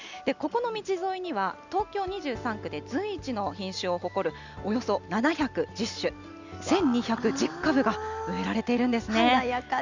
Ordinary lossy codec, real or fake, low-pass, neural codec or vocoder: Opus, 64 kbps; real; 7.2 kHz; none